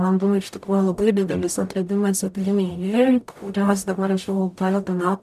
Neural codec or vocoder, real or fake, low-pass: codec, 44.1 kHz, 0.9 kbps, DAC; fake; 14.4 kHz